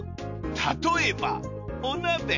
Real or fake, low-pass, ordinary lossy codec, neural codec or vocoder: real; 7.2 kHz; none; none